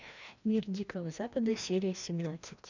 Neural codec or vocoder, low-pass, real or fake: codec, 16 kHz, 1 kbps, FreqCodec, larger model; 7.2 kHz; fake